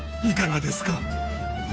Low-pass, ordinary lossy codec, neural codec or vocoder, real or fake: none; none; codec, 16 kHz, 2 kbps, FunCodec, trained on Chinese and English, 25 frames a second; fake